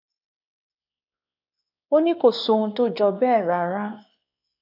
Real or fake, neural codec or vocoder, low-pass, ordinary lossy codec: fake; codec, 16 kHz, 2 kbps, X-Codec, WavLM features, trained on Multilingual LibriSpeech; 5.4 kHz; none